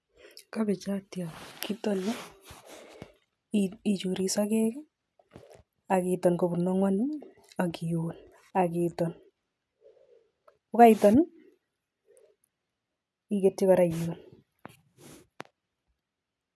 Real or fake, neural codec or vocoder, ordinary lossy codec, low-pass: real; none; none; none